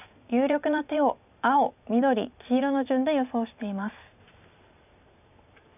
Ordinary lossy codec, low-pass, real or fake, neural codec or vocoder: none; 3.6 kHz; fake; vocoder, 44.1 kHz, 128 mel bands every 512 samples, BigVGAN v2